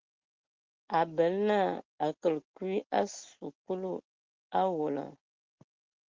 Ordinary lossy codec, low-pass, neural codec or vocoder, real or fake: Opus, 16 kbps; 7.2 kHz; none; real